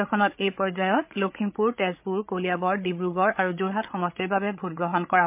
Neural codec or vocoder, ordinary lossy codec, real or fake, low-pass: codec, 16 kHz, 8 kbps, FreqCodec, larger model; MP3, 32 kbps; fake; 3.6 kHz